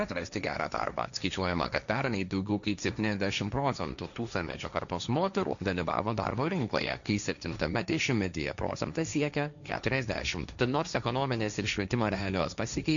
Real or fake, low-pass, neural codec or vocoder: fake; 7.2 kHz; codec, 16 kHz, 1.1 kbps, Voila-Tokenizer